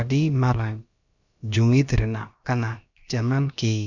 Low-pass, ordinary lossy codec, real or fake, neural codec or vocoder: 7.2 kHz; none; fake; codec, 16 kHz, about 1 kbps, DyCAST, with the encoder's durations